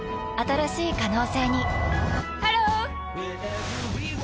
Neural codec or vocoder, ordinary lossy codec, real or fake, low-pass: none; none; real; none